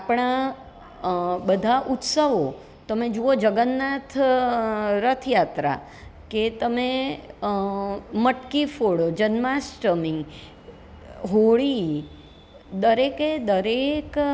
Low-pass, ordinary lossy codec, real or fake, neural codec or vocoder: none; none; real; none